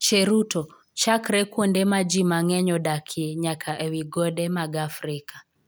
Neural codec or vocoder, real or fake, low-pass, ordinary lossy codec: none; real; none; none